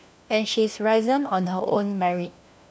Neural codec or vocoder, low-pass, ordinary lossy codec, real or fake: codec, 16 kHz, 1 kbps, FunCodec, trained on LibriTTS, 50 frames a second; none; none; fake